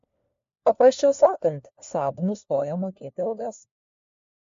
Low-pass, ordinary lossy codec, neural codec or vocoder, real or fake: 7.2 kHz; MP3, 48 kbps; codec, 16 kHz, 1 kbps, FunCodec, trained on LibriTTS, 50 frames a second; fake